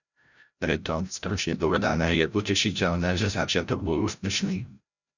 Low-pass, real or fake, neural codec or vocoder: 7.2 kHz; fake; codec, 16 kHz, 0.5 kbps, FreqCodec, larger model